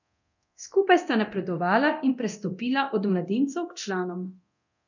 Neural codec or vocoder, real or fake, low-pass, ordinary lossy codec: codec, 24 kHz, 0.9 kbps, DualCodec; fake; 7.2 kHz; none